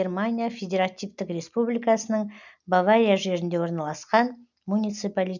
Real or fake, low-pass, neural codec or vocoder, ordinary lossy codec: real; 7.2 kHz; none; none